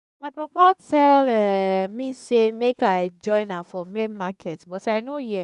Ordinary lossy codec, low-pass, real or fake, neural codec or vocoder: none; 10.8 kHz; fake; codec, 24 kHz, 1 kbps, SNAC